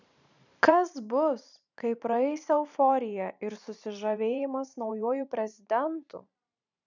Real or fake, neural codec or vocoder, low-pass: fake; vocoder, 44.1 kHz, 128 mel bands every 512 samples, BigVGAN v2; 7.2 kHz